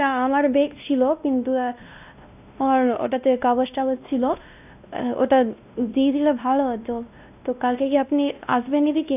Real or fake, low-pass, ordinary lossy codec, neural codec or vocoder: fake; 3.6 kHz; none; codec, 16 kHz, 1 kbps, X-Codec, WavLM features, trained on Multilingual LibriSpeech